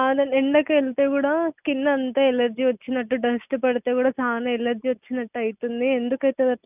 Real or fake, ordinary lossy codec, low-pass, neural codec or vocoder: real; none; 3.6 kHz; none